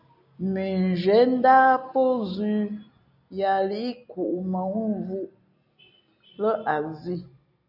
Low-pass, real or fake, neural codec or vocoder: 5.4 kHz; real; none